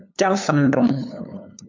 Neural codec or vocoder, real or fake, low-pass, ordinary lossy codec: codec, 16 kHz, 2 kbps, FunCodec, trained on LibriTTS, 25 frames a second; fake; 7.2 kHz; MP3, 64 kbps